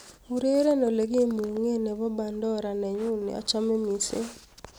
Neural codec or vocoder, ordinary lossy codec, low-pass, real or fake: none; none; none; real